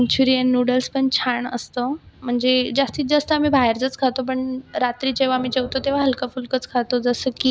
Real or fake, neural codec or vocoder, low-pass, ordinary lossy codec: real; none; none; none